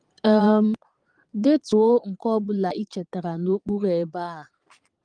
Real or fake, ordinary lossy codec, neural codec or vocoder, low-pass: fake; Opus, 24 kbps; vocoder, 22.05 kHz, 80 mel bands, Vocos; 9.9 kHz